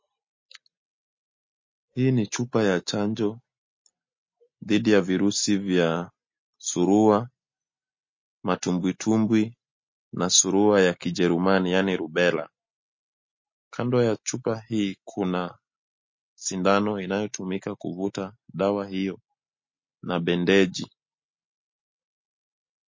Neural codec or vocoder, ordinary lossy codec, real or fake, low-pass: none; MP3, 32 kbps; real; 7.2 kHz